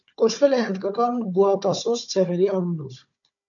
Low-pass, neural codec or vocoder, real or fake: 7.2 kHz; codec, 16 kHz, 4 kbps, FunCodec, trained on Chinese and English, 50 frames a second; fake